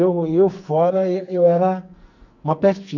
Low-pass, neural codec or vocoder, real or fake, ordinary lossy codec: 7.2 kHz; codec, 44.1 kHz, 2.6 kbps, SNAC; fake; none